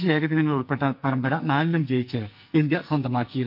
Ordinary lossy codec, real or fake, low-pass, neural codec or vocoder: none; fake; 5.4 kHz; codec, 44.1 kHz, 2.6 kbps, SNAC